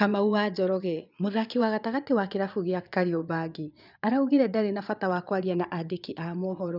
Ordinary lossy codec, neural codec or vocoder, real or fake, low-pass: none; vocoder, 22.05 kHz, 80 mel bands, Vocos; fake; 5.4 kHz